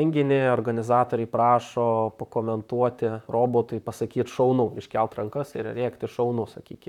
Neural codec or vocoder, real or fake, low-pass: vocoder, 44.1 kHz, 128 mel bands, Pupu-Vocoder; fake; 19.8 kHz